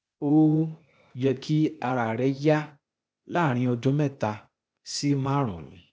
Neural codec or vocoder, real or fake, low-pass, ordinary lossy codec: codec, 16 kHz, 0.8 kbps, ZipCodec; fake; none; none